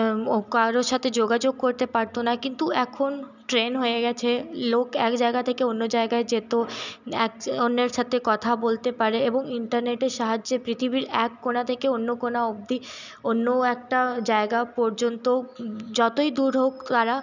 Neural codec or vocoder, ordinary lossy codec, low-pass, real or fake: none; none; 7.2 kHz; real